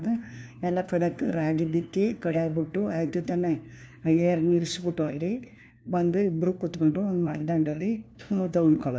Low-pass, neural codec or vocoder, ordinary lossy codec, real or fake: none; codec, 16 kHz, 1 kbps, FunCodec, trained on LibriTTS, 50 frames a second; none; fake